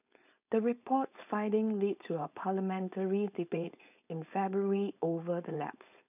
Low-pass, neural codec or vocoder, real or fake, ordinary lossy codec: 3.6 kHz; codec, 16 kHz, 4.8 kbps, FACodec; fake; none